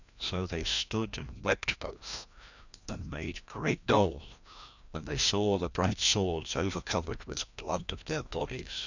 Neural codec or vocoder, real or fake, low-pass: codec, 16 kHz, 1 kbps, FreqCodec, larger model; fake; 7.2 kHz